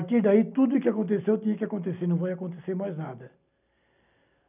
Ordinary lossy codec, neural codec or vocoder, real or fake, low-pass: none; none; real; 3.6 kHz